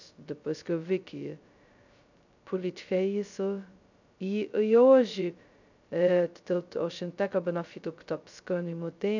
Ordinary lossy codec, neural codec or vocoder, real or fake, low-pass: none; codec, 16 kHz, 0.2 kbps, FocalCodec; fake; 7.2 kHz